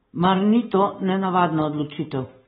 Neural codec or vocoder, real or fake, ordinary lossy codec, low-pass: none; real; AAC, 16 kbps; 10.8 kHz